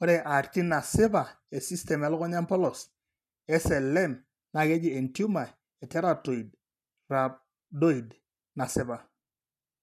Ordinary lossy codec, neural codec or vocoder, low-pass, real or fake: none; none; 14.4 kHz; real